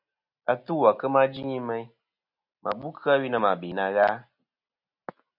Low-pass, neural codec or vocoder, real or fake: 5.4 kHz; none; real